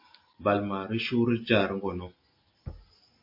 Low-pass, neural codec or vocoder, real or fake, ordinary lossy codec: 5.4 kHz; none; real; MP3, 32 kbps